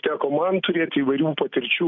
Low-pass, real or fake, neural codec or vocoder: 7.2 kHz; real; none